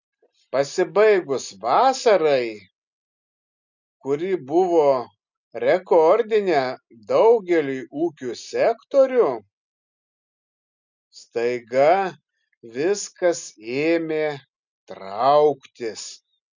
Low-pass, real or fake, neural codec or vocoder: 7.2 kHz; real; none